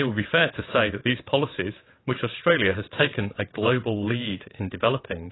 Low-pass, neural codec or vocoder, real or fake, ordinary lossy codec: 7.2 kHz; vocoder, 22.05 kHz, 80 mel bands, WaveNeXt; fake; AAC, 16 kbps